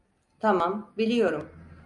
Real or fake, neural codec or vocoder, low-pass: real; none; 10.8 kHz